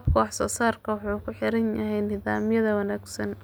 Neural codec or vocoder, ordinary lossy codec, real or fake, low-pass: none; none; real; none